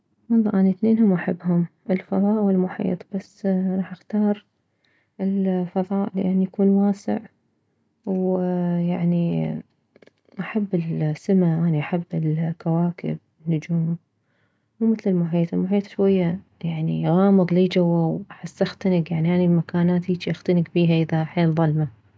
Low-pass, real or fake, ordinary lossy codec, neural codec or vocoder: none; real; none; none